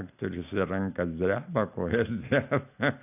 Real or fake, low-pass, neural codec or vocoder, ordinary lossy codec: real; 3.6 kHz; none; AAC, 32 kbps